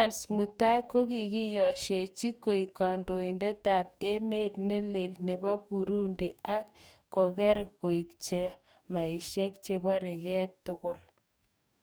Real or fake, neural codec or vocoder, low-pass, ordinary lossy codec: fake; codec, 44.1 kHz, 2.6 kbps, DAC; none; none